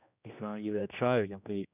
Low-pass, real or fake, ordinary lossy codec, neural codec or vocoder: 3.6 kHz; fake; none; codec, 16 kHz, 1 kbps, X-Codec, HuBERT features, trained on general audio